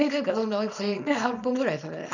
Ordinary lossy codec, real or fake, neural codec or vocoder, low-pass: none; fake; codec, 24 kHz, 0.9 kbps, WavTokenizer, small release; 7.2 kHz